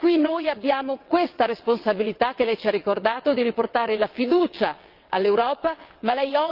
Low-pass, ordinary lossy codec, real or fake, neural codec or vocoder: 5.4 kHz; Opus, 24 kbps; fake; vocoder, 22.05 kHz, 80 mel bands, WaveNeXt